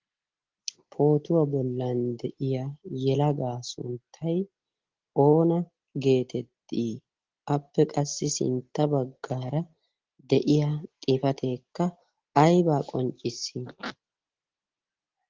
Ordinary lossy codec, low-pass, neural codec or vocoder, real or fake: Opus, 16 kbps; 7.2 kHz; none; real